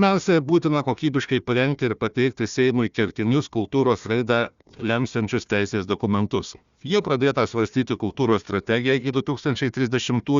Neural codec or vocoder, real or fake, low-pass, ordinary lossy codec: codec, 16 kHz, 1 kbps, FunCodec, trained on Chinese and English, 50 frames a second; fake; 7.2 kHz; Opus, 64 kbps